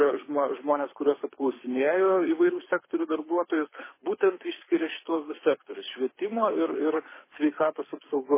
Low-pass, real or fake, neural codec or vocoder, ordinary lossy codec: 3.6 kHz; fake; codec, 24 kHz, 6 kbps, HILCodec; MP3, 16 kbps